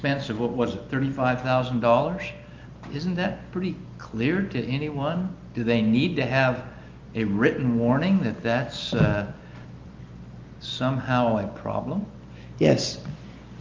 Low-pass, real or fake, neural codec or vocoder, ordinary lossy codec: 7.2 kHz; real; none; Opus, 24 kbps